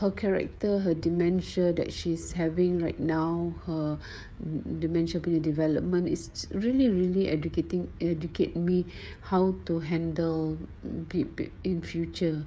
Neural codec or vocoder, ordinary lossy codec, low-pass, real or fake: codec, 16 kHz, 16 kbps, FreqCodec, smaller model; none; none; fake